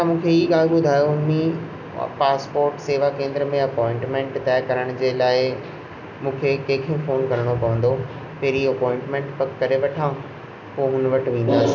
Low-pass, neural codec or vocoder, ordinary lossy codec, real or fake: 7.2 kHz; none; none; real